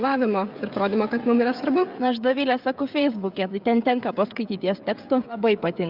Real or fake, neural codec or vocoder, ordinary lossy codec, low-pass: fake; codec, 16 kHz, 16 kbps, FreqCodec, smaller model; Opus, 64 kbps; 5.4 kHz